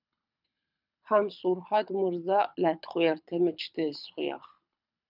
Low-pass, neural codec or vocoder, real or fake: 5.4 kHz; codec, 24 kHz, 6 kbps, HILCodec; fake